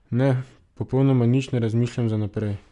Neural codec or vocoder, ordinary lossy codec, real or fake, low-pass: none; none; real; 10.8 kHz